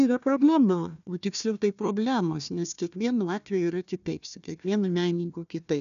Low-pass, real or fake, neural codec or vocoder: 7.2 kHz; fake; codec, 16 kHz, 1 kbps, FunCodec, trained on Chinese and English, 50 frames a second